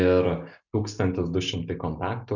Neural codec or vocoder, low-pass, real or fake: none; 7.2 kHz; real